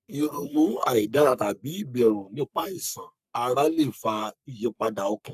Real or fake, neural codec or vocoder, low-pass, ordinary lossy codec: fake; codec, 44.1 kHz, 3.4 kbps, Pupu-Codec; 14.4 kHz; none